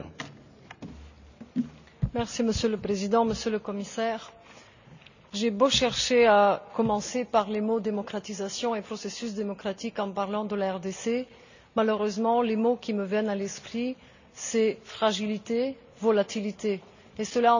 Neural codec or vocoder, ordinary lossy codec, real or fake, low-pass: none; none; real; 7.2 kHz